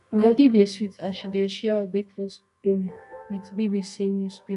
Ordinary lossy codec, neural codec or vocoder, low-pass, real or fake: none; codec, 24 kHz, 0.9 kbps, WavTokenizer, medium music audio release; 10.8 kHz; fake